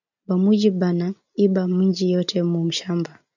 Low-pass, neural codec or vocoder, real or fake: 7.2 kHz; none; real